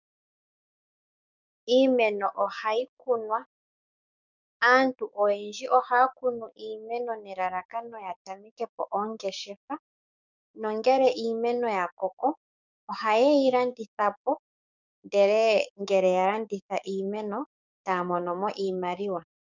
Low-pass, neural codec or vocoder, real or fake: 7.2 kHz; codec, 16 kHz, 6 kbps, DAC; fake